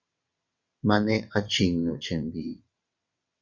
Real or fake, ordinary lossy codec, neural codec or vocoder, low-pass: fake; Opus, 64 kbps; vocoder, 22.05 kHz, 80 mel bands, WaveNeXt; 7.2 kHz